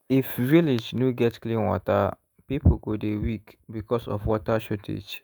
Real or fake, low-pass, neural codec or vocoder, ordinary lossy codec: fake; none; autoencoder, 48 kHz, 128 numbers a frame, DAC-VAE, trained on Japanese speech; none